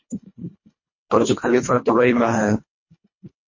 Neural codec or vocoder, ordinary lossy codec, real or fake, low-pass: codec, 24 kHz, 1.5 kbps, HILCodec; MP3, 32 kbps; fake; 7.2 kHz